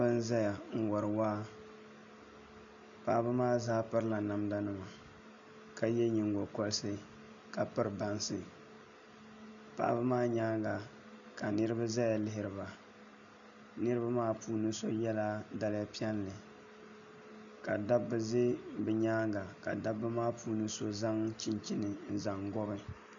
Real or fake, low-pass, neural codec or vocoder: real; 7.2 kHz; none